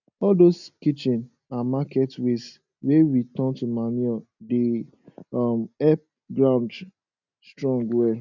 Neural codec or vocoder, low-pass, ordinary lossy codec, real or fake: none; 7.2 kHz; none; real